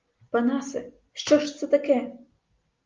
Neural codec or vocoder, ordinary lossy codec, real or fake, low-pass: none; Opus, 32 kbps; real; 7.2 kHz